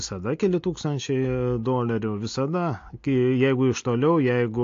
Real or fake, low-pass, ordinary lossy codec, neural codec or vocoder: real; 7.2 kHz; AAC, 48 kbps; none